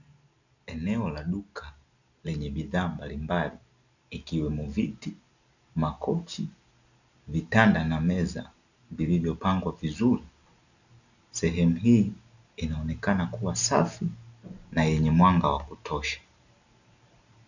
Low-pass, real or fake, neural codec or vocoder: 7.2 kHz; real; none